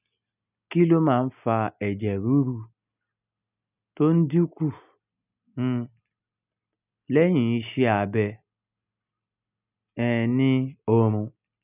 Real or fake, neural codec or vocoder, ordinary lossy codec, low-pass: real; none; none; 3.6 kHz